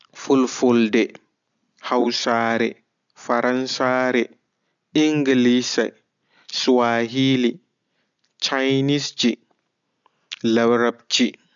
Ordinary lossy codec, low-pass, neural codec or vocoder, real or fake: none; 7.2 kHz; none; real